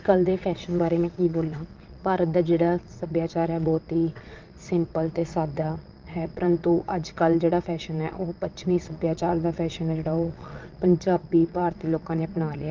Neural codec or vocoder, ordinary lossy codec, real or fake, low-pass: vocoder, 22.05 kHz, 80 mel bands, WaveNeXt; Opus, 32 kbps; fake; 7.2 kHz